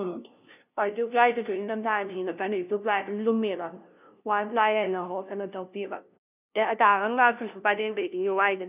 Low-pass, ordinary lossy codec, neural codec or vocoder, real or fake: 3.6 kHz; none; codec, 16 kHz, 0.5 kbps, FunCodec, trained on LibriTTS, 25 frames a second; fake